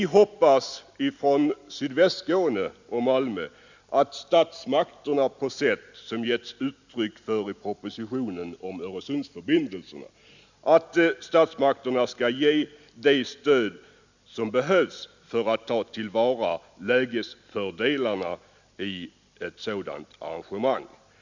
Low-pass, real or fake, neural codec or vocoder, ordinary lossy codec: 7.2 kHz; real; none; Opus, 64 kbps